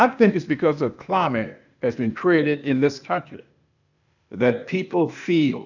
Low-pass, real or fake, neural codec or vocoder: 7.2 kHz; fake; codec, 16 kHz, 0.8 kbps, ZipCodec